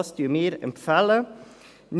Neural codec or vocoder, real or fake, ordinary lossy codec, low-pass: none; real; none; none